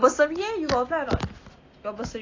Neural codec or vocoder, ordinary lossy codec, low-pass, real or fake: none; AAC, 32 kbps; 7.2 kHz; real